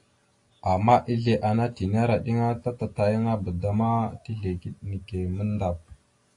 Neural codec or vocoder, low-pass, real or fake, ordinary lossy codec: none; 10.8 kHz; real; AAC, 48 kbps